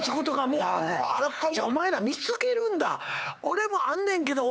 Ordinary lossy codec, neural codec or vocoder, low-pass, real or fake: none; codec, 16 kHz, 4 kbps, X-Codec, HuBERT features, trained on LibriSpeech; none; fake